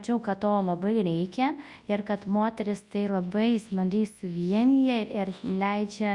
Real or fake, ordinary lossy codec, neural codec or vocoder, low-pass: fake; Opus, 64 kbps; codec, 24 kHz, 0.9 kbps, WavTokenizer, large speech release; 10.8 kHz